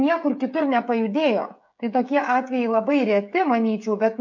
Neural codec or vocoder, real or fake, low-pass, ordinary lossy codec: codec, 16 kHz, 16 kbps, FreqCodec, smaller model; fake; 7.2 kHz; MP3, 48 kbps